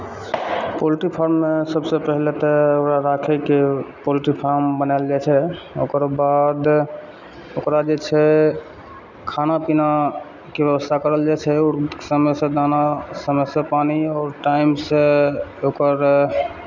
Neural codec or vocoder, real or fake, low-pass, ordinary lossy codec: none; real; 7.2 kHz; none